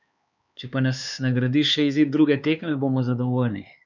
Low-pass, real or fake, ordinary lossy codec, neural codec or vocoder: 7.2 kHz; fake; none; codec, 16 kHz, 4 kbps, X-Codec, HuBERT features, trained on LibriSpeech